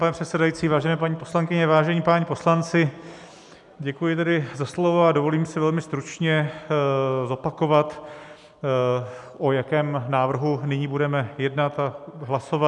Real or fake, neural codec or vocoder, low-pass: real; none; 10.8 kHz